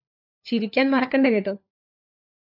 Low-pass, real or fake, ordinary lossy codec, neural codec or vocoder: 5.4 kHz; fake; AAC, 48 kbps; codec, 16 kHz, 4 kbps, FunCodec, trained on LibriTTS, 50 frames a second